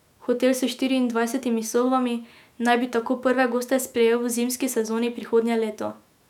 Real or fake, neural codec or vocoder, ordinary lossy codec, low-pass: fake; autoencoder, 48 kHz, 128 numbers a frame, DAC-VAE, trained on Japanese speech; none; 19.8 kHz